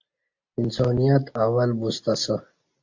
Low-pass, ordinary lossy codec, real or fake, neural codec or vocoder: 7.2 kHz; AAC, 48 kbps; real; none